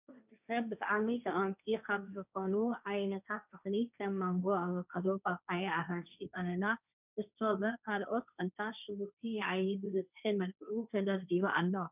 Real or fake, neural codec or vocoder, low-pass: fake; codec, 16 kHz, 1.1 kbps, Voila-Tokenizer; 3.6 kHz